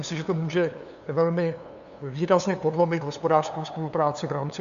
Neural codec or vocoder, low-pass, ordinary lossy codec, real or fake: codec, 16 kHz, 2 kbps, FunCodec, trained on LibriTTS, 25 frames a second; 7.2 kHz; MP3, 96 kbps; fake